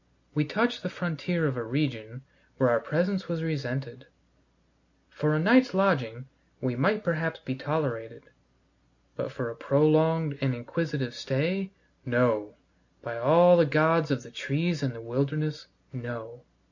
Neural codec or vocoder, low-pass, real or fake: none; 7.2 kHz; real